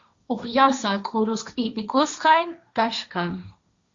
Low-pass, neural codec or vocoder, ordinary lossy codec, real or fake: 7.2 kHz; codec, 16 kHz, 1.1 kbps, Voila-Tokenizer; Opus, 64 kbps; fake